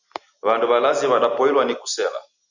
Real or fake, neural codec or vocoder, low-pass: real; none; 7.2 kHz